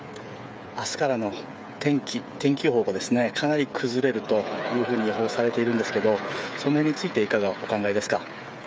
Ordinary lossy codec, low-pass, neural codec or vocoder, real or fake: none; none; codec, 16 kHz, 8 kbps, FreqCodec, smaller model; fake